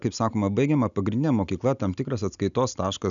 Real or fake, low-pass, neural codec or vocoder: real; 7.2 kHz; none